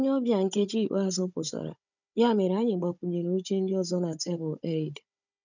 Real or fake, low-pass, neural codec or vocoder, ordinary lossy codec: fake; 7.2 kHz; codec, 16 kHz, 16 kbps, FunCodec, trained on Chinese and English, 50 frames a second; none